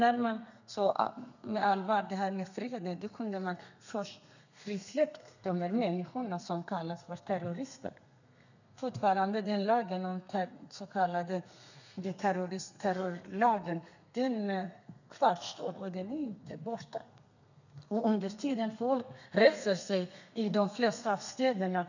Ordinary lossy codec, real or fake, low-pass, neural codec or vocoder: none; fake; 7.2 kHz; codec, 32 kHz, 1.9 kbps, SNAC